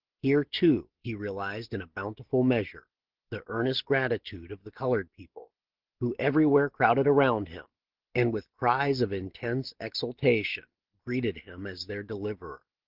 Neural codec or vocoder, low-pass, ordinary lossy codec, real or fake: none; 5.4 kHz; Opus, 16 kbps; real